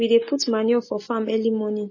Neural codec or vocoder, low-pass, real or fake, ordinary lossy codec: none; 7.2 kHz; real; MP3, 32 kbps